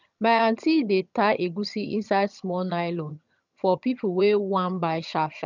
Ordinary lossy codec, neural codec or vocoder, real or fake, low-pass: none; vocoder, 22.05 kHz, 80 mel bands, HiFi-GAN; fake; 7.2 kHz